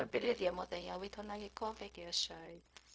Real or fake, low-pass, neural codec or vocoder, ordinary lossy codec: fake; none; codec, 16 kHz, 0.4 kbps, LongCat-Audio-Codec; none